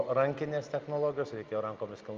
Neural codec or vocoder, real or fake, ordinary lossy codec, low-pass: none; real; Opus, 32 kbps; 7.2 kHz